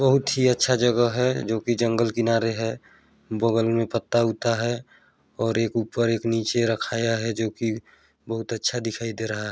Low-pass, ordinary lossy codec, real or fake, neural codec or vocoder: none; none; real; none